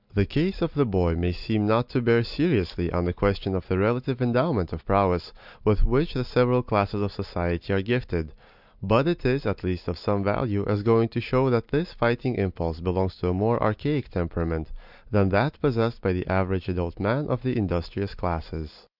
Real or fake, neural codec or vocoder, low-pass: real; none; 5.4 kHz